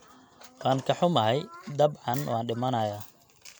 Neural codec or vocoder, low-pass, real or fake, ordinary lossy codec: none; none; real; none